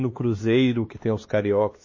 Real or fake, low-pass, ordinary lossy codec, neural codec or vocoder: fake; 7.2 kHz; MP3, 32 kbps; codec, 16 kHz, 2 kbps, X-Codec, HuBERT features, trained on LibriSpeech